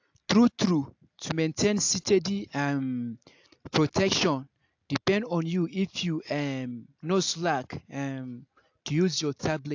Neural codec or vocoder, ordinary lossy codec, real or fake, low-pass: none; AAC, 48 kbps; real; 7.2 kHz